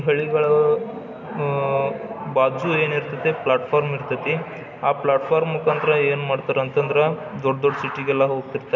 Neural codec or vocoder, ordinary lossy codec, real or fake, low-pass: none; none; real; 7.2 kHz